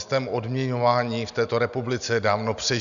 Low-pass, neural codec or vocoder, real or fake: 7.2 kHz; none; real